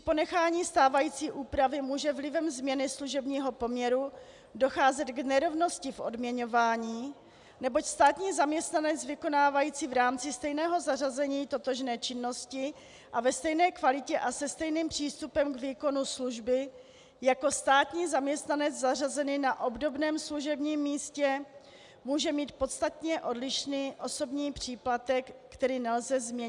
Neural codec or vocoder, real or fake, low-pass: none; real; 10.8 kHz